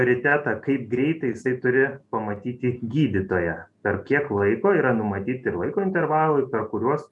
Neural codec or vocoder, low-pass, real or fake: none; 10.8 kHz; real